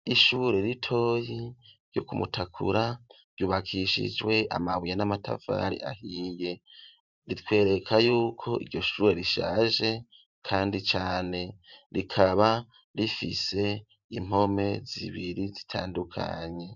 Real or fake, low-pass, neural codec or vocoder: fake; 7.2 kHz; vocoder, 44.1 kHz, 128 mel bands every 512 samples, BigVGAN v2